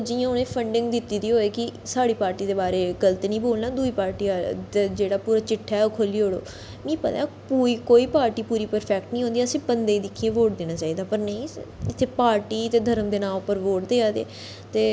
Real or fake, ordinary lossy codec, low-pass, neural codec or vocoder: real; none; none; none